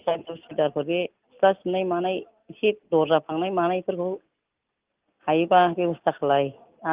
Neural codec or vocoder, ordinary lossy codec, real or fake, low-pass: none; Opus, 32 kbps; real; 3.6 kHz